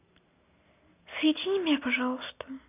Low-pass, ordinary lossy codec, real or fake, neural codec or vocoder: 3.6 kHz; none; real; none